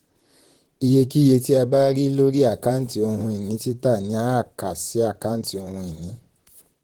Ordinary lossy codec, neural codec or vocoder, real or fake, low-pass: Opus, 16 kbps; vocoder, 44.1 kHz, 128 mel bands, Pupu-Vocoder; fake; 19.8 kHz